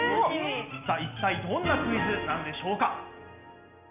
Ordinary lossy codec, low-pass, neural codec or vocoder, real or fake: none; 3.6 kHz; none; real